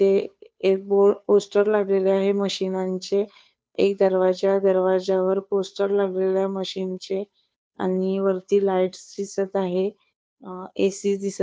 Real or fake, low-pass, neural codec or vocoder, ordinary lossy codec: fake; none; codec, 16 kHz, 2 kbps, FunCodec, trained on Chinese and English, 25 frames a second; none